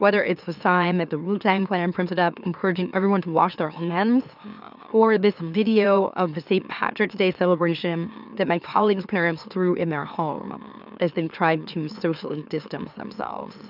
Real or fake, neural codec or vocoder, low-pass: fake; autoencoder, 44.1 kHz, a latent of 192 numbers a frame, MeloTTS; 5.4 kHz